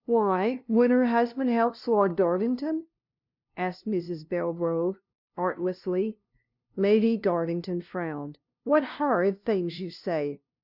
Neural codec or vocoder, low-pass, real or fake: codec, 16 kHz, 0.5 kbps, FunCodec, trained on LibriTTS, 25 frames a second; 5.4 kHz; fake